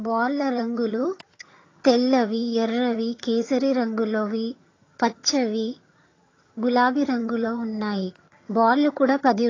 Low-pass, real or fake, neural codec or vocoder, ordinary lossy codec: 7.2 kHz; fake; vocoder, 22.05 kHz, 80 mel bands, HiFi-GAN; AAC, 32 kbps